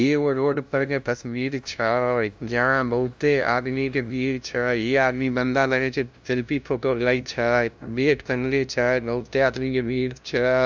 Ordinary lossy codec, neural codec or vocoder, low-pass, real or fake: none; codec, 16 kHz, 0.5 kbps, FunCodec, trained on LibriTTS, 25 frames a second; none; fake